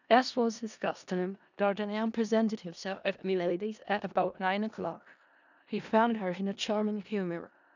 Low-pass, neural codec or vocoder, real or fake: 7.2 kHz; codec, 16 kHz in and 24 kHz out, 0.4 kbps, LongCat-Audio-Codec, four codebook decoder; fake